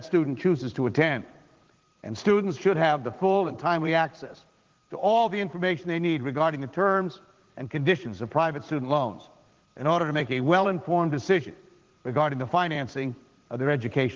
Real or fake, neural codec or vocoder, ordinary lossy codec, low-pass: fake; vocoder, 44.1 kHz, 80 mel bands, Vocos; Opus, 16 kbps; 7.2 kHz